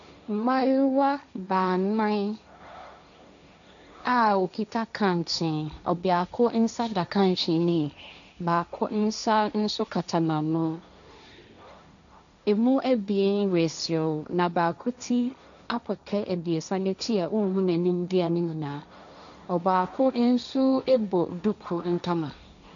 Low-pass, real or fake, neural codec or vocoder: 7.2 kHz; fake; codec, 16 kHz, 1.1 kbps, Voila-Tokenizer